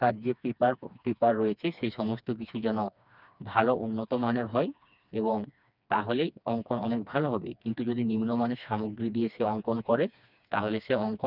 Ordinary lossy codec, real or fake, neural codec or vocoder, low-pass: none; fake; codec, 16 kHz, 2 kbps, FreqCodec, smaller model; 5.4 kHz